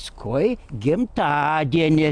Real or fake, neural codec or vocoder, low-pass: real; none; 9.9 kHz